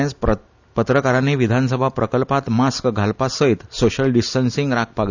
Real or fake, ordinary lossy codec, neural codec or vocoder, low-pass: real; none; none; 7.2 kHz